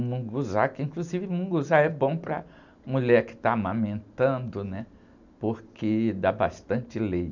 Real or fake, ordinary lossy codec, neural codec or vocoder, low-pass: real; none; none; 7.2 kHz